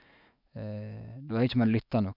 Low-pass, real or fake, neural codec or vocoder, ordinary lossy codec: 5.4 kHz; fake; autoencoder, 48 kHz, 128 numbers a frame, DAC-VAE, trained on Japanese speech; none